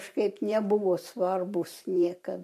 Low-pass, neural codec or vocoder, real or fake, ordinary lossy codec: 14.4 kHz; vocoder, 44.1 kHz, 128 mel bands every 512 samples, BigVGAN v2; fake; MP3, 64 kbps